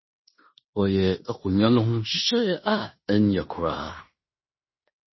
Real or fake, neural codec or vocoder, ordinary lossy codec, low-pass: fake; codec, 16 kHz in and 24 kHz out, 0.9 kbps, LongCat-Audio-Codec, fine tuned four codebook decoder; MP3, 24 kbps; 7.2 kHz